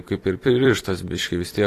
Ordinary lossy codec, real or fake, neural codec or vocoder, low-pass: AAC, 48 kbps; fake; vocoder, 44.1 kHz, 128 mel bands every 256 samples, BigVGAN v2; 14.4 kHz